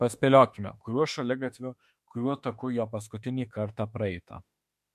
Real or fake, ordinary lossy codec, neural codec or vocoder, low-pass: fake; MP3, 64 kbps; autoencoder, 48 kHz, 32 numbers a frame, DAC-VAE, trained on Japanese speech; 14.4 kHz